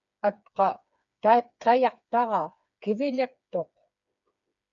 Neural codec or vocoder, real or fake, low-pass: codec, 16 kHz, 4 kbps, FreqCodec, smaller model; fake; 7.2 kHz